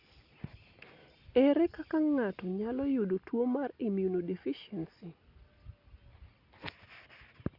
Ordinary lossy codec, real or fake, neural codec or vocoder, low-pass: Opus, 64 kbps; real; none; 5.4 kHz